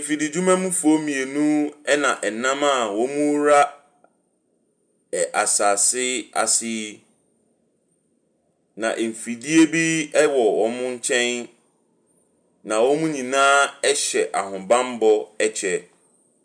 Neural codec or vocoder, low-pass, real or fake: none; 9.9 kHz; real